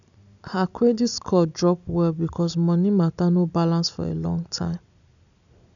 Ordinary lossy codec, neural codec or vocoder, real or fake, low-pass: none; none; real; 7.2 kHz